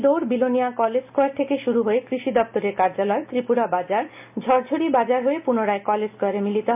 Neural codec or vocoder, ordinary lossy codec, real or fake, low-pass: none; MP3, 32 kbps; real; 3.6 kHz